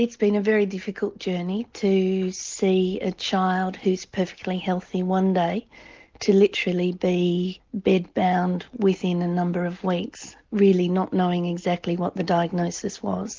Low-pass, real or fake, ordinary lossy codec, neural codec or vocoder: 7.2 kHz; real; Opus, 32 kbps; none